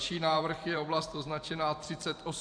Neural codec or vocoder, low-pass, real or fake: vocoder, 44.1 kHz, 128 mel bands every 512 samples, BigVGAN v2; 9.9 kHz; fake